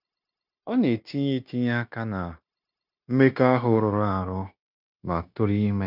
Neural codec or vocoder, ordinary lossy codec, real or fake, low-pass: codec, 16 kHz, 0.9 kbps, LongCat-Audio-Codec; none; fake; 5.4 kHz